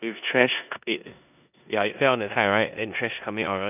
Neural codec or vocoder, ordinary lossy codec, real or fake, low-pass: codec, 16 kHz in and 24 kHz out, 0.9 kbps, LongCat-Audio-Codec, four codebook decoder; none; fake; 3.6 kHz